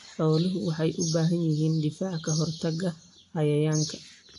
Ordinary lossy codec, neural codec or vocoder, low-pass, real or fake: AAC, 48 kbps; none; 10.8 kHz; real